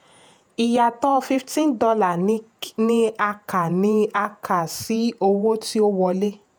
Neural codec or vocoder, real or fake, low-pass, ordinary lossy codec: vocoder, 48 kHz, 128 mel bands, Vocos; fake; none; none